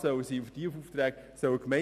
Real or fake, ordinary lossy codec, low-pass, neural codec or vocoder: real; none; 14.4 kHz; none